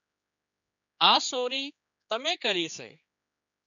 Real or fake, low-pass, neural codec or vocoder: fake; 7.2 kHz; codec, 16 kHz, 4 kbps, X-Codec, HuBERT features, trained on general audio